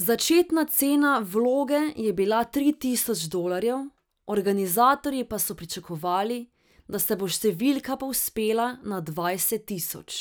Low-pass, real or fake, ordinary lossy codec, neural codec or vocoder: none; real; none; none